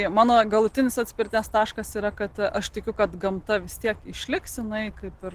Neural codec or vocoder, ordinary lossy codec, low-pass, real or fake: none; Opus, 16 kbps; 14.4 kHz; real